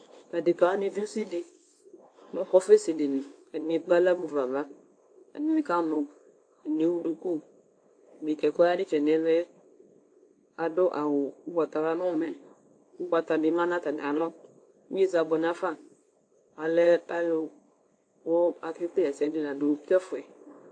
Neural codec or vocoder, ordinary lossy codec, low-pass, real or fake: codec, 24 kHz, 0.9 kbps, WavTokenizer, small release; AAC, 48 kbps; 9.9 kHz; fake